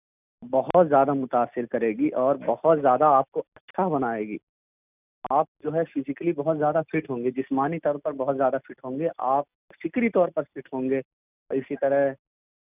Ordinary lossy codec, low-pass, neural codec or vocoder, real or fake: none; 3.6 kHz; none; real